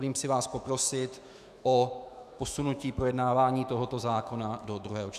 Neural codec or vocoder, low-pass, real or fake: autoencoder, 48 kHz, 128 numbers a frame, DAC-VAE, trained on Japanese speech; 14.4 kHz; fake